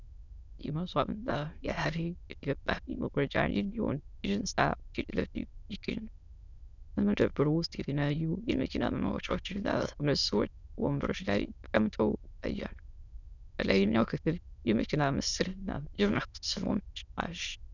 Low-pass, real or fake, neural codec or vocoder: 7.2 kHz; fake; autoencoder, 22.05 kHz, a latent of 192 numbers a frame, VITS, trained on many speakers